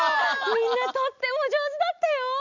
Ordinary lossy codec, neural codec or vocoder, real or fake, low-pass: Opus, 64 kbps; none; real; 7.2 kHz